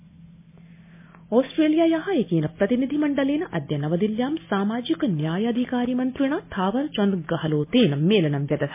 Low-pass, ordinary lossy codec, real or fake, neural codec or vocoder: 3.6 kHz; MP3, 24 kbps; real; none